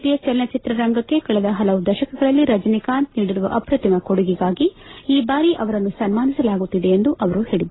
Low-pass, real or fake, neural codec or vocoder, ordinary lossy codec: 7.2 kHz; real; none; AAC, 16 kbps